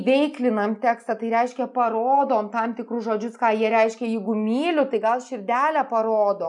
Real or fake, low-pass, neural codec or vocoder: real; 10.8 kHz; none